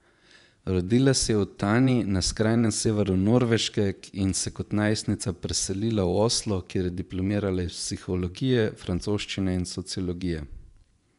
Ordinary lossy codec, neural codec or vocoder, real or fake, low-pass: none; vocoder, 24 kHz, 100 mel bands, Vocos; fake; 10.8 kHz